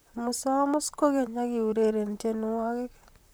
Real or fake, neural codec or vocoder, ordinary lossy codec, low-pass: fake; vocoder, 44.1 kHz, 128 mel bands, Pupu-Vocoder; none; none